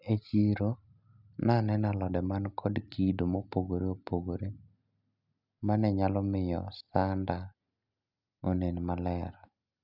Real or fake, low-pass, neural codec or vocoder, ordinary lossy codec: real; 5.4 kHz; none; none